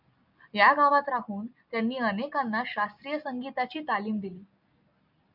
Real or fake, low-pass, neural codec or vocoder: real; 5.4 kHz; none